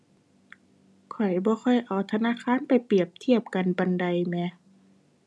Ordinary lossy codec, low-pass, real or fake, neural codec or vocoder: none; none; real; none